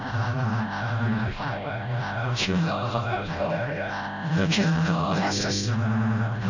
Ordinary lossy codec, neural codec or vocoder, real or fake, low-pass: none; codec, 16 kHz, 0.5 kbps, FreqCodec, smaller model; fake; 7.2 kHz